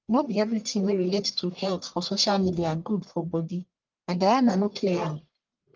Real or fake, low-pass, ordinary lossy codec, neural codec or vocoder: fake; 7.2 kHz; Opus, 24 kbps; codec, 44.1 kHz, 1.7 kbps, Pupu-Codec